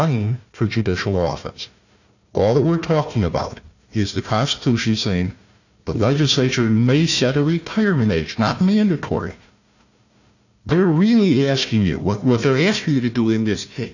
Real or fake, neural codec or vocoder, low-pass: fake; codec, 16 kHz, 1 kbps, FunCodec, trained on Chinese and English, 50 frames a second; 7.2 kHz